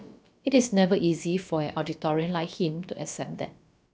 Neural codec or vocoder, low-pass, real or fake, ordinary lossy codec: codec, 16 kHz, about 1 kbps, DyCAST, with the encoder's durations; none; fake; none